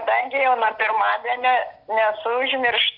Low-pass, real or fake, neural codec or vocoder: 5.4 kHz; real; none